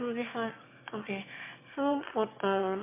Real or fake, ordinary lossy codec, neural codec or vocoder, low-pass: fake; MP3, 24 kbps; autoencoder, 22.05 kHz, a latent of 192 numbers a frame, VITS, trained on one speaker; 3.6 kHz